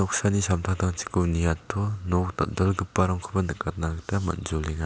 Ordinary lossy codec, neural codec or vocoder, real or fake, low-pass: none; none; real; none